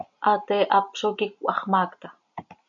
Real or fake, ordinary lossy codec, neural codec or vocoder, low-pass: real; MP3, 96 kbps; none; 7.2 kHz